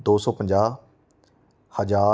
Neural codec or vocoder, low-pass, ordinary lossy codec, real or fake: none; none; none; real